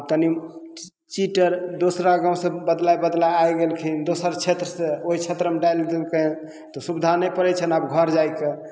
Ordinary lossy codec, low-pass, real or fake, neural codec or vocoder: none; none; real; none